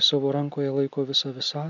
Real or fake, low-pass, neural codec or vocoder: real; 7.2 kHz; none